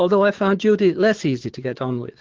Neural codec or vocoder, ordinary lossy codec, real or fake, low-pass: codec, 16 kHz, 6 kbps, DAC; Opus, 16 kbps; fake; 7.2 kHz